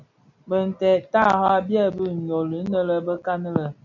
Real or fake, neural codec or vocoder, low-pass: real; none; 7.2 kHz